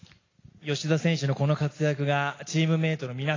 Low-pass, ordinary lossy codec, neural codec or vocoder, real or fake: 7.2 kHz; AAC, 32 kbps; none; real